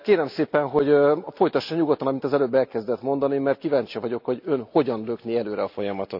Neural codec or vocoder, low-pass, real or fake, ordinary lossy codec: none; 5.4 kHz; real; none